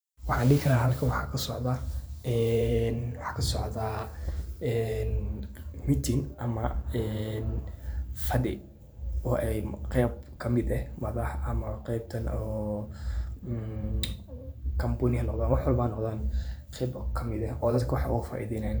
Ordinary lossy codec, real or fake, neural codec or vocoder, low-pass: none; fake; vocoder, 44.1 kHz, 128 mel bands every 512 samples, BigVGAN v2; none